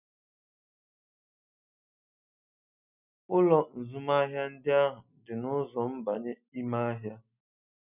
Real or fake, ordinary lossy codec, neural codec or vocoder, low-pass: real; none; none; 3.6 kHz